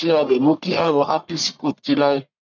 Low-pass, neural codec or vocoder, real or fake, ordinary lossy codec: 7.2 kHz; codec, 44.1 kHz, 1.7 kbps, Pupu-Codec; fake; none